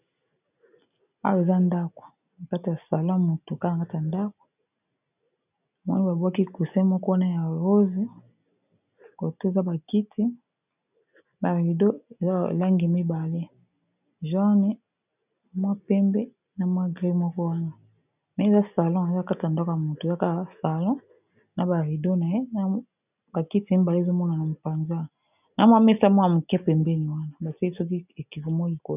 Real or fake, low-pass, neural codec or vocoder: real; 3.6 kHz; none